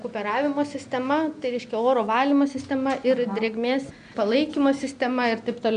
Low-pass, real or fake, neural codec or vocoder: 9.9 kHz; real; none